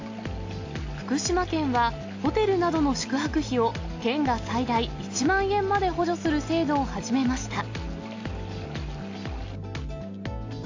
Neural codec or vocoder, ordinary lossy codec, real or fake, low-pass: none; AAC, 48 kbps; real; 7.2 kHz